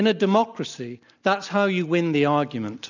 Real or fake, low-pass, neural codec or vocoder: real; 7.2 kHz; none